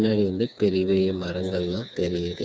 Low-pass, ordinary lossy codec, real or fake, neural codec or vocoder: none; none; fake; codec, 16 kHz, 4 kbps, FreqCodec, smaller model